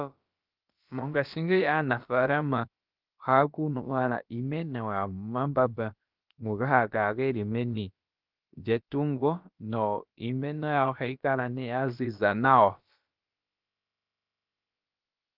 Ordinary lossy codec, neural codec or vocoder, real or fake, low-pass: Opus, 32 kbps; codec, 16 kHz, about 1 kbps, DyCAST, with the encoder's durations; fake; 5.4 kHz